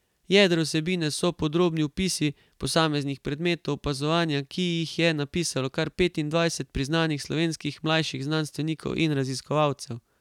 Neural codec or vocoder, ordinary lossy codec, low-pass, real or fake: none; none; 19.8 kHz; real